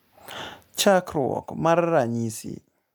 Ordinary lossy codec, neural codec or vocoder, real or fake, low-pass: none; none; real; none